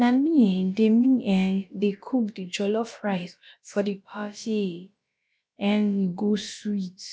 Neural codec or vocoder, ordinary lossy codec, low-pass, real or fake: codec, 16 kHz, about 1 kbps, DyCAST, with the encoder's durations; none; none; fake